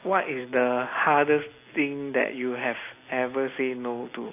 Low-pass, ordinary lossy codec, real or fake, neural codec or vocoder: 3.6 kHz; AAC, 24 kbps; real; none